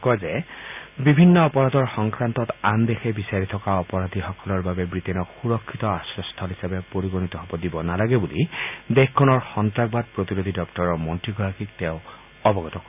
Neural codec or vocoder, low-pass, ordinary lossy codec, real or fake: none; 3.6 kHz; none; real